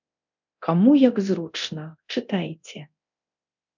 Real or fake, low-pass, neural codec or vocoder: fake; 7.2 kHz; codec, 24 kHz, 0.9 kbps, DualCodec